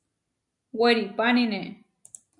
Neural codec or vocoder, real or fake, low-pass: none; real; 10.8 kHz